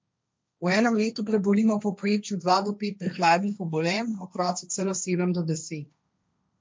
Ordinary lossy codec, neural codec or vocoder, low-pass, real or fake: none; codec, 16 kHz, 1.1 kbps, Voila-Tokenizer; none; fake